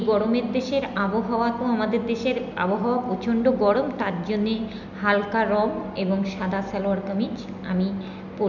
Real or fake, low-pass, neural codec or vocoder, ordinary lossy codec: real; 7.2 kHz; none; none